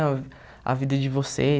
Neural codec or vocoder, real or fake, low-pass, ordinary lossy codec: none; real; none; none